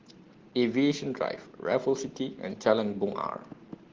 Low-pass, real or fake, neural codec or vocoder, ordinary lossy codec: 7.2 kHz; real; none; Opus, 16 kbps